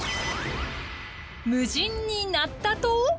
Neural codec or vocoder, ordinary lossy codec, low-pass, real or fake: none; none; none; real